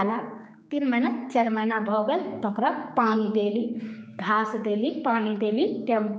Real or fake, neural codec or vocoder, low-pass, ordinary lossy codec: fake; codec, 16 kHz, 4 kbps, X-Codec, HuBERT features, trained on general audio; none; none